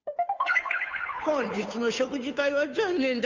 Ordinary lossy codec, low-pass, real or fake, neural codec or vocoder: MP3, 64 kbps; 7.2 kHz; fake; codec, 16 kHz, 2 kbps, FunCodec, trained on Chinese and English, 25 frames a second